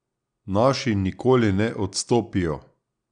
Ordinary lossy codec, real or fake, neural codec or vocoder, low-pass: none; real; none; 9.9 kHz